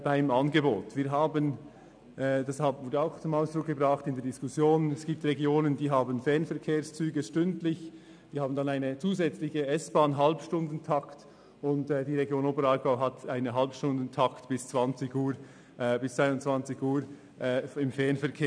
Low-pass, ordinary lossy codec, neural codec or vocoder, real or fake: 9.9 kHz; none; none; real